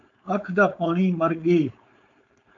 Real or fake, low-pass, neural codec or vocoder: fake; 7.2 kHz; codec, 16 kHz, 4.8 kbps, FACodec